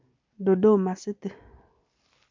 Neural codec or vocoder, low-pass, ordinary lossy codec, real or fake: none; 7.2 kHz; MP3, 64 kbps; real